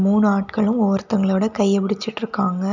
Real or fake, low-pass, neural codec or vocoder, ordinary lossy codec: real; 7.2 kHz; none; none